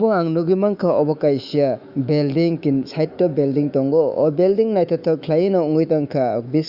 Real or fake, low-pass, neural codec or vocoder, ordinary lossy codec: fake; 5.4 kHz; autoencoder, 48 kHz, 128 numbers a frame, DAC-VAE, trained on Japanese speech; Opus, 64 kbps